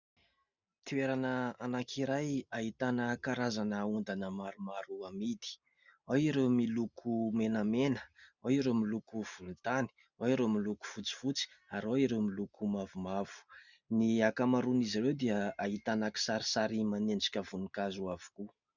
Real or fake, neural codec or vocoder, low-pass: real; none; 7.2 kHz